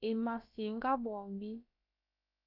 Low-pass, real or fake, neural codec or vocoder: 5.4 kHz; fake; codec, 16 kHz, about 1 kbps, DyCAST, with the encoder's durations